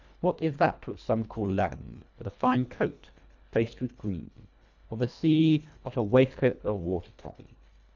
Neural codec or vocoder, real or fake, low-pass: codec, 24 kHz, 1.5 kbps, HILCodec; fake; 7.2 kHz